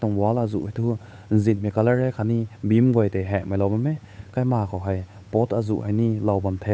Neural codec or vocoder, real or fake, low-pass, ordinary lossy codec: codec, 16 kHz, 8 kbps, FunCodec, trained on Chinese and English, 25 frames a second; fake; none; none